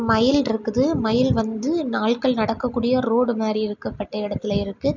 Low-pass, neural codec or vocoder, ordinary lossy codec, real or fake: 7.2 kHz; none; none; real